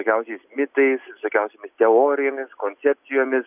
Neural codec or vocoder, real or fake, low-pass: none; real; 3.6 kHz